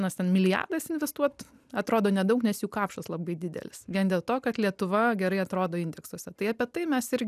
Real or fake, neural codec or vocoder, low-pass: real; none; 14.4 kHz